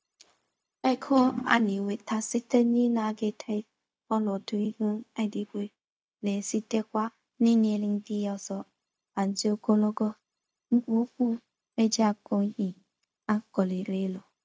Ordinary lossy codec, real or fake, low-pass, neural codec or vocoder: none; fake; none; codec, 16 kHz, 0.4 kbps, LongCat-Audio-Codec